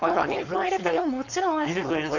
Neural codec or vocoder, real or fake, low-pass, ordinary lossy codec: codec, 16 kHz, 4.8 kbps, FACodec; fake; 7.2 kHz; none